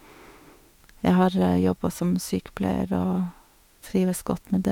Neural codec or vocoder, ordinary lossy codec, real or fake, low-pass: autoencoder, 48 kHz, 32 numbers a frame, DAC-VAE, trained on Japanese speech; MP3, 96 kbps; fake; 19.8 kHz